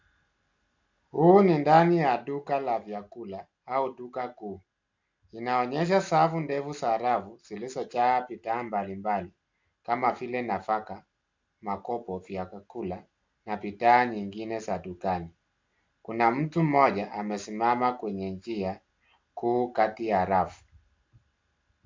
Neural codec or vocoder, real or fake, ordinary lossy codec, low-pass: none; real; MP3, 64 kbps; 7.2 kHz